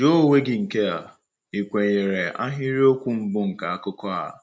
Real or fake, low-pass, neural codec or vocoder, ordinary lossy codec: real; none; none; none